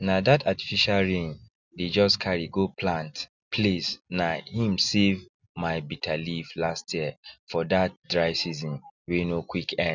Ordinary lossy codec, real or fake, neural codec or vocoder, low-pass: none; real; none; 7.2 kHz